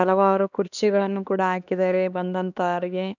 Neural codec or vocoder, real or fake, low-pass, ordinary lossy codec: codec, 16 kHz, 2 kbps, X-Codec, HuBERT features, trained on LibriSpeech; fake; 7.2 kHz; none